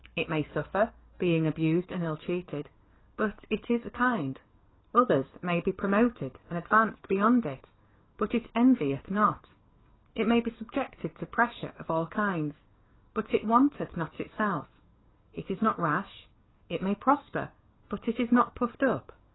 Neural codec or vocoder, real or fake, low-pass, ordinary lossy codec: codec, 44.1 kHz, 7.8 kbps, DAC; fake; 7.2 kHz; AAC, 16 kbps